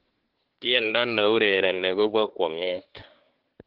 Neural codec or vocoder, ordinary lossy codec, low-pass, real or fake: codec, 24 kHz, 1 kbps, SNAC; Opus, 16 kbps; 5.4 kHz; fake